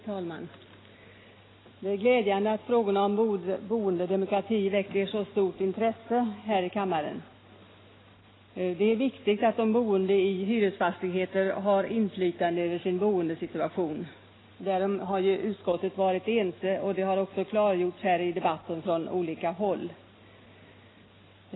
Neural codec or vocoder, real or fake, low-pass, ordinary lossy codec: none; real; 7.2 kHz; AAC, 16 kbps